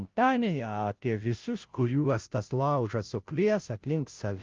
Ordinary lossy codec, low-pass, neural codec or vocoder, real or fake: Opus, 32 kbps; 7.2 kHz; codec, 16 kHz, 0.5 kbps, FunCodec, trained on Chinese and English, 25 frames a second; fake